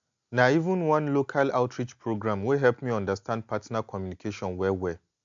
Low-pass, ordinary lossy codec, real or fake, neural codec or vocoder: 7.2 kHz; MP3, 64 kbps; real; none